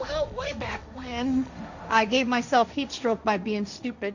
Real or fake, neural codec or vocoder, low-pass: fake; codec, 16 kHz, 1.1 kbps, Voila-Tokenizer; 7.2 kHz